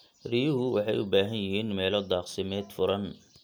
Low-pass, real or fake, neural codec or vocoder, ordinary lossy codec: none; real; none; none